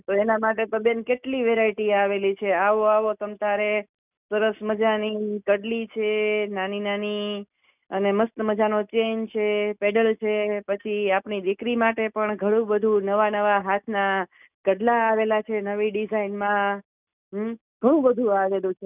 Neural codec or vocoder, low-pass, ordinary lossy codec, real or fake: none; 3.6 kHz; none; real